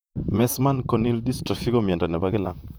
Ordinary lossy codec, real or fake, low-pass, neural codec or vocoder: none; fake; none; vocoder, 44.1 kHz, 128 mel bands, Pupu-Vocoder